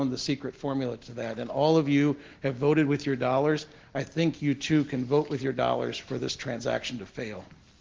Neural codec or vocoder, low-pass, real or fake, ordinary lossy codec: none; 7.2 kHz; real; Opus, 16 kbps